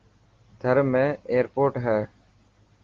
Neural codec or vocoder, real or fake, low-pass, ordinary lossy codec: none; real; 7.2 kHz; Opus, 16 kbps